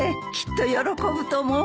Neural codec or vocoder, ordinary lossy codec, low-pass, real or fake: none; none; none; real